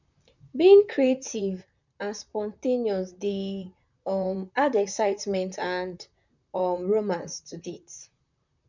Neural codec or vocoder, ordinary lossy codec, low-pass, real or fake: vocoder, 44.1 kHz, 128 mel bands, Pupu-Vocoder; none; 7.2 kHz; fake